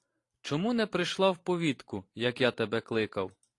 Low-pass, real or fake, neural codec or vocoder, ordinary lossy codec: 10.8 kHz; fake; vocoder, 44.1 kHz, 128 mel bands every 512 samples, BigVGAN v2; AAC, 48 kbps